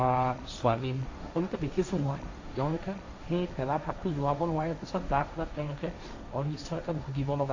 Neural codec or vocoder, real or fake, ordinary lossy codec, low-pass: codec, 16 kHz, 1.1 kbps, Voila-Tokenizer; fake; AAC, 48 kbps; 7.2 kHz